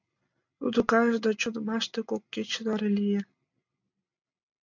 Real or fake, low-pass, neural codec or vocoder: real; 7.2 kHz; none